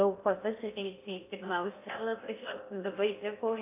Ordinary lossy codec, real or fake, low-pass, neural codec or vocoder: AAC, 24 kbps; fake; 3.6 kHz; codec, 16 kHz in and 24 kHz out, 0.6 kbps, FocalCodec, streaming, 4096 codes